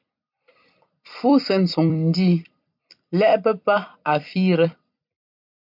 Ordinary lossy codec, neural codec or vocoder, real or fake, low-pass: MP3, 48 kbps; vocoder, 44.1 kHz, 128 mel bands every 256 samples, BigVGAN v2; fake; 5.4 kHz